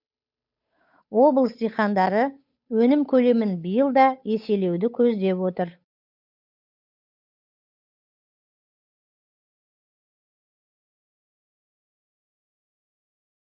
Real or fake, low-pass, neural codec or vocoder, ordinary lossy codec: fake; 5.4 kHz; codec, 16 kHz, 8 kbps, FunCodec, trained on Chinese and English, 25 frames a second; none